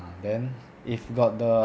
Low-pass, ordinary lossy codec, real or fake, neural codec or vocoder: none; none; real; none